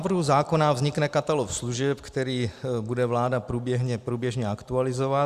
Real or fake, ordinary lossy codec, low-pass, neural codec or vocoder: real; Opus, 64 kbps; 14.4 kHz; none